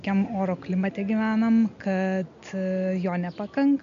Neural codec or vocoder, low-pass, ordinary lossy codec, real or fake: none; 7.2 kHz; MP3, 64 kbps; real